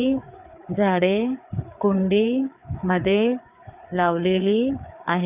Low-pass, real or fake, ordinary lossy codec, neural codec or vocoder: 3.6 kHz; fake; none; codec, 16 kHz, 4 kbps, FreqCodec, larger model